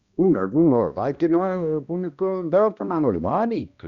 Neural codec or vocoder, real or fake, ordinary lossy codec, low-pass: codec, 16 kHz, 1 kbps, X-Codec, HuBERT features, trained on balanced general audio; fake; Opus, 64 kbps; 7.2 kHz